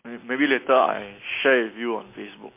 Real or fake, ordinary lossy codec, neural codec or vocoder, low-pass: real; MP3, 24 kbps; none; 3.6 kHz